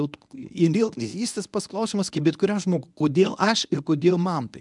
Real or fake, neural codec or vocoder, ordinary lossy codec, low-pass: fake; codec, 24 kHz, 0.9 kbps, WavTokenizer, medium speech release version 1; MP3, 96 kbps; 10.8 kHz